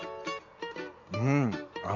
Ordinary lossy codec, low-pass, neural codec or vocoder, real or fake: none; 7.2 kHz; none; real